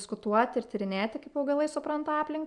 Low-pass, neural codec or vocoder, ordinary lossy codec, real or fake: 10.8 kHz; none; MP3, 96 kbps; real